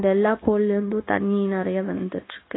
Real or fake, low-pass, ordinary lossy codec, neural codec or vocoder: fake; 7.2 kHz; AAC, 16 kbps; codec, 16 kHz, 0.9 kbps, LongCat-Audio-Codec